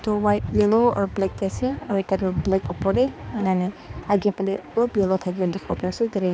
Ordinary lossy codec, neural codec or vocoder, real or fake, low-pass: none; codec, 16 kHz, 2 kbps, X-Codec, HuBERT features, trained on balanced general audio; fake; none